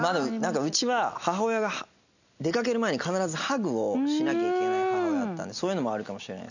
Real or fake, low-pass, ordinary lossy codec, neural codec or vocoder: real; 7.2 kHz; none; none